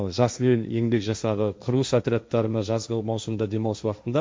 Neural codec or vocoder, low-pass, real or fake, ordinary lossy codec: codec, 16 kHz, 1.1 kbps, Voila-Tokenizer; none; fake; none